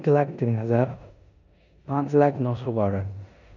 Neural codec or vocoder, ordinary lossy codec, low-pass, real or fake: codec, 16 kHz in and 24 kHz out, 0.9 kbps, LongCat-Audio-Codec, four codebook decoder; none; 7.2 kHz; fake